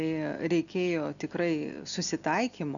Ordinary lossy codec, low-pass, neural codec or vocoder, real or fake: MP3, 96 kbps; 7.2 kHz; none; real